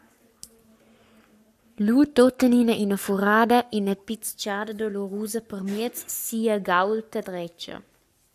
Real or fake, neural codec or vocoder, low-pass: fake; codec, 44.1 kHz, 7.8 kbps, Pupu-Codec; 14.4 kHz